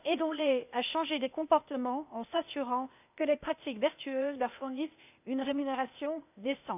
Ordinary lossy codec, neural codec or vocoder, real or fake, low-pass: none; codec, 16 kHz, 0.8 kbps, ZipCodec; fake; 3.6 kHz